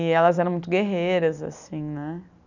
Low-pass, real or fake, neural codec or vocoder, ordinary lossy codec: 7.2 kHz; real; none; none